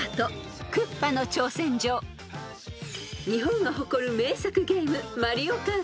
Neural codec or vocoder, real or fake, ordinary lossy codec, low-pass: none; real; none; none